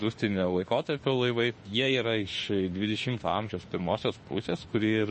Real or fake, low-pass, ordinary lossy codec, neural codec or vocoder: fake; 10.8 kHz; MP3, 32 kbps; autoencoder, 48 kHz, 32 numbers a frame, DAC-VAE, trained on Japanese speech